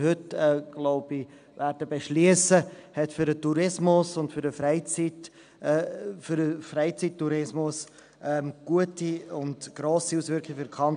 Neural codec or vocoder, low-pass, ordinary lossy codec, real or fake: none; 9.9 kHz; AAC, 96 kbps; real